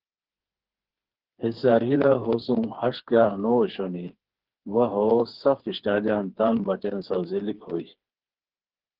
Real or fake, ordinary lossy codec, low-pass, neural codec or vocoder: fake; Opus, 16 kbps; 5.4 kHz; codec, 16 kHz, 4 kbps, FreqCodec, smaller model